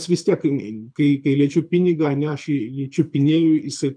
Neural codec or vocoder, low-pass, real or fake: codec, 24 kHz, 6 kbps, HILCodec; 9.9 kHz; fake